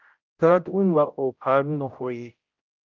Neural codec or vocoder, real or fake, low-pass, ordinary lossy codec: codec, 16 kHz, 0.5 kbps, X-Codec, HuBERT features, trained on general audio; fake; 7.2 kHz; Opus, 32 kbps